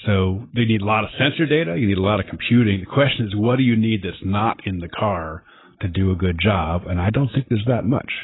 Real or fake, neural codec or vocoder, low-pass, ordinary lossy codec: real; none; 7.2 kHz; AAC, 16 kbps